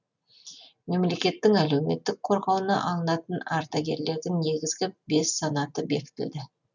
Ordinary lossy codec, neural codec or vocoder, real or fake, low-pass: none; none; real; 7.2 kHz